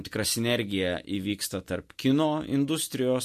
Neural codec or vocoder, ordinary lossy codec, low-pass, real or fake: none; MP3, 64 kbps; 14.4 kHz; real